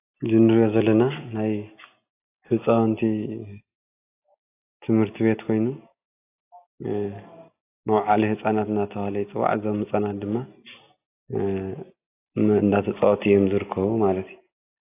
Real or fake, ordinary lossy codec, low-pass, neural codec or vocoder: real; AAC, 24 kbps; 3.6 kHz; none